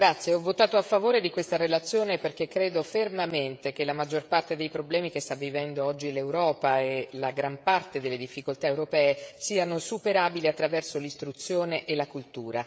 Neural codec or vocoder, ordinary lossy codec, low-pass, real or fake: codec, 16 kHz, 16 kbps, FreqCodec, smaller model; none; none; fake